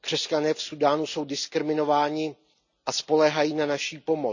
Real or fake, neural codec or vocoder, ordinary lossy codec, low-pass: real; none; none; 7.2 kHz